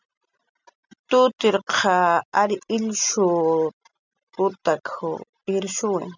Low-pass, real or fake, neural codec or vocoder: 7.2 kHz; real; none